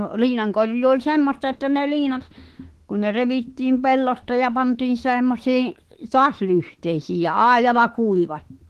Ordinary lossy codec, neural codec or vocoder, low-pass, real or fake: Opus, 16 kbps; autoencoder, 48 kHz, 32 numbers a frame, DAC-VAE, trained on Japanese speech; 14.4 kHz; fake